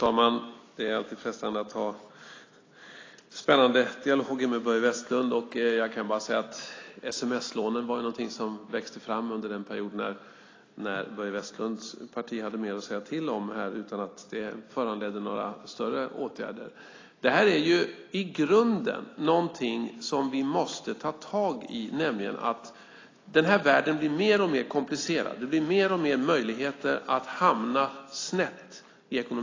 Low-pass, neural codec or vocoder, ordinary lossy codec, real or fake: 7.2 kHz; none; AAC, 32 kbps; real